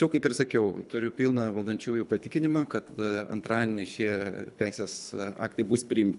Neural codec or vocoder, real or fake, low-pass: codec, 24 kHz, 3 kbps, HILCodec; fake; 10.8 kHz